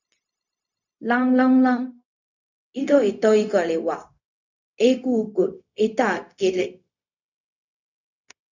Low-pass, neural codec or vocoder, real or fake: 7.2 kHz; codec, 16 kHz, 0.4 kbps, LongCat-Audio-Codec; fake